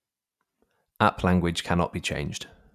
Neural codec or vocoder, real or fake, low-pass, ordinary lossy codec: none; real; 14.4 kHz; none